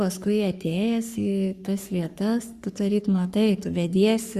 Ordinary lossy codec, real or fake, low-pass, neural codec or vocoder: Opus, 64 kbps; fake; 14.4 kHz; codec, 44.1 kHz, 3.4 kbps, Pupu-Codec